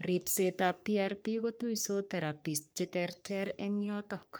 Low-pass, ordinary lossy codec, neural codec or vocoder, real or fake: none; none; codec, 44.1 kHz, 3.4 kbps, Pupu-Codec; fake